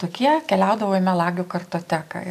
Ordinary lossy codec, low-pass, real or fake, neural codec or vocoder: AAC, 96 kbps; 14.4 kHz; real; none